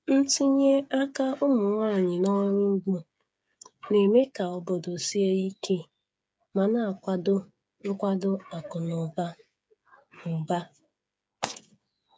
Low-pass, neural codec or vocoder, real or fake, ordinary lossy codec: none; codec, 16 kHz, 8 kbps, FreqCodec, smaller model; fake; none